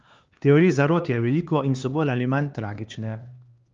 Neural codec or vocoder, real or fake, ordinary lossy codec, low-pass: codec, 16 kHz, 2 kbps, X-Codec, HuBERT features, trained on LibriSpeech; fake; Opus, 32 kbps; 7.2 kHz